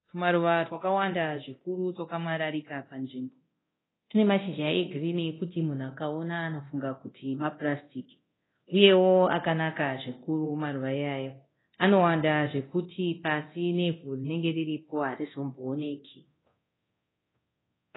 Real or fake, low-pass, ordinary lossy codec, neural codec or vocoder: fake; 7.2 kHz; AAC, 16 kbps; codec, 24 kHz, 0.9 kbps, DualCodec